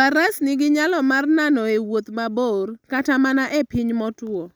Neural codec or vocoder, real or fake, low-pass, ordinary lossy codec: none; real; none; none